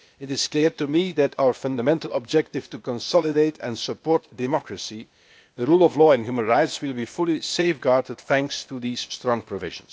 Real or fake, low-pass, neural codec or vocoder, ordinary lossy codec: fake; none; codec, 16 kHz, 0.8 kbps, ZipCodec; none